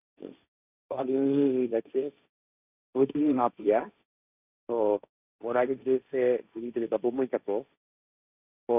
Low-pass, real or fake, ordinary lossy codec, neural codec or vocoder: 3.6 kHz; fake; none; codec, 16 kHz, 1.1 kbps, Voila-Tokenizer